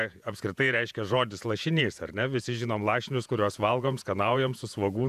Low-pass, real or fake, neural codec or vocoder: 14.4 kHz; fake; vocoder, 48 kHz, 128 mel bands, Vocos